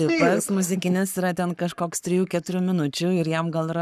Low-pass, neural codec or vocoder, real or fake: 14.4 kHz; codec, 44.1 kHz, 7.8 kbps, Pupu-Codec; fake